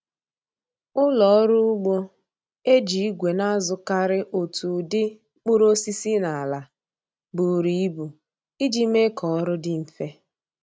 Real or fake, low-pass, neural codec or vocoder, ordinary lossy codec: real; none; none; none